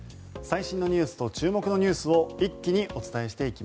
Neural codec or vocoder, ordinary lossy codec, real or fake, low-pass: none; none; real; none